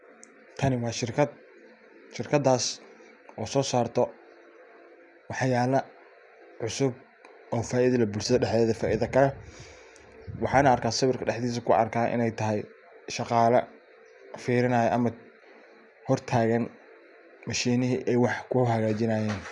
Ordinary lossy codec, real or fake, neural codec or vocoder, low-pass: none; real; none; 10.8 kHz